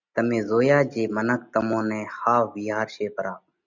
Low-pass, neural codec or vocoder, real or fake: 7.2 kHz; none; real